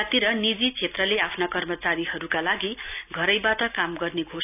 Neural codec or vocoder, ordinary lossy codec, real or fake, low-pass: none; none; real; 3.6 kHz